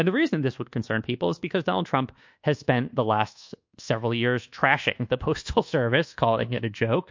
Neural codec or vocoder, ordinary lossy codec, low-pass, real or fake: codec, 24 kHz, 1.2 kbps, DualCodec; MP3, 48 kbps; 7.2 kHz; fake